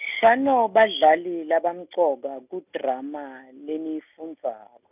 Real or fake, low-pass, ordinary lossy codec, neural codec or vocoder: real; 3.6 kHz; none; none